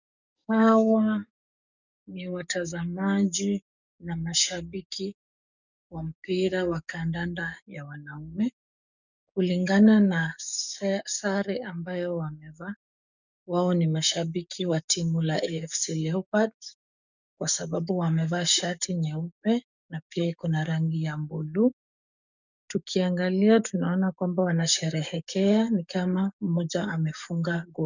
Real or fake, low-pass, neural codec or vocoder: fake; 7.2 kHz; codec, 44.1 kHz, 7.8 kbps, DAC